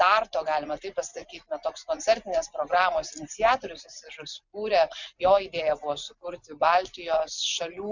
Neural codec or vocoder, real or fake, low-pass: none; real; 7.2 kHz